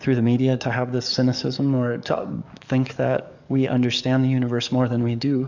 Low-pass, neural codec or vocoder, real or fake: 7.2 kHz; codec, 44.1 kHz, 7.8 kbps, DAC; fake